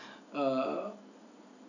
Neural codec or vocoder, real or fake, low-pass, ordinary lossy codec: none; real; 7.2 kHz; none